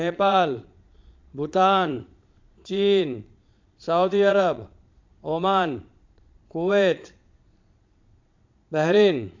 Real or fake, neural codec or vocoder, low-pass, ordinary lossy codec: fake; vocoder, 22.05 kHz, 80 mel bands, Vocos; 7.2 kHz; MP3, 64 kbps